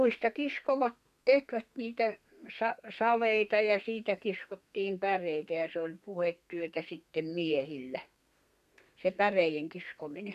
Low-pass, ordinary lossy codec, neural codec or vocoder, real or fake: 14.4 kHz; none; codec, 32 kHz, 1.9 kbps, SNAC; fake